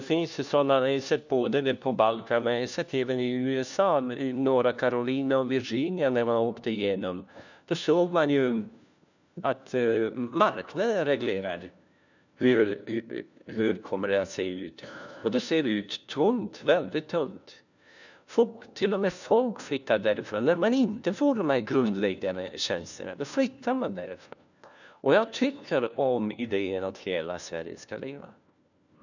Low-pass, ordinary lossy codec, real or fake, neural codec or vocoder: 7.2 kHz; none; fake; codec, 16 kHz, 1 kbps, FunCodec, trained on LibriTTS, 50 frames a second